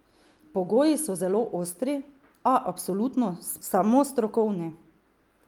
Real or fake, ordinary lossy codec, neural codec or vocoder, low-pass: real; Opus, 32 kbps; none; 19.8 kHz